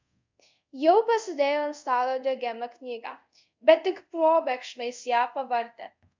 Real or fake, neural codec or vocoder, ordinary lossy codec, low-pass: fake; codec, 24 kHz, 0.5 kbps, DualCodec; MP3, 64 kbps; 7.2 kHz